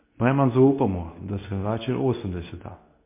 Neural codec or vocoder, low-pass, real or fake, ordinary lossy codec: codec, 24 kHz, 0.9 kbps, WavTokenizer, medium speech release version 2; 3.6 kHz; fake; MP3, 24 kbps